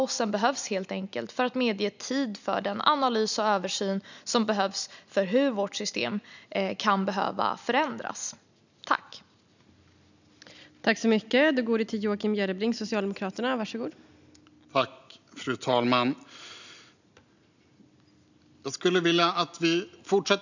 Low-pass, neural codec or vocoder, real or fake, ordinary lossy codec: 7.2 kHz; none; real; none